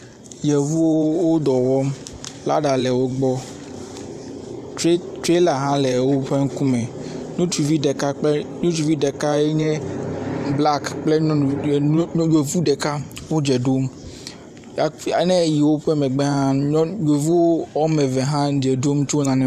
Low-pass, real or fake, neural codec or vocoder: 14.4 kHz; fake; vocoder, 44.1 kHz, 128 mel bands every 512 samples, BigVGAN v2